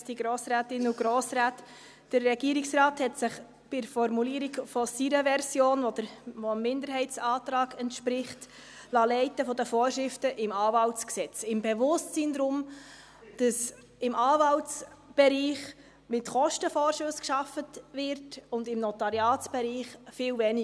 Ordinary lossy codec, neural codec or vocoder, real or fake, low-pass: none; none; real; none